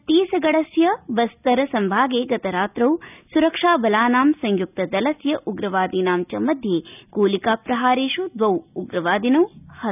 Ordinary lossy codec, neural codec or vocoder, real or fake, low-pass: none; none; real; 3.6 kHz